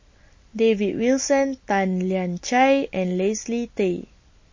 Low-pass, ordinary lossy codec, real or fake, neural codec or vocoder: 7.2 kHz; MP3, 32 kbps; real; none